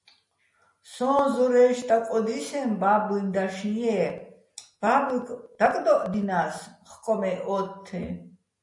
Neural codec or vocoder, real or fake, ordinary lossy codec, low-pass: none; real; MP3, 48 kbps; 10.8 kHz